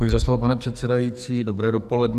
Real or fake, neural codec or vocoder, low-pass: fake; codec, 44.1 kHz, 2.6 kbps, SNAC; 14.4 kHz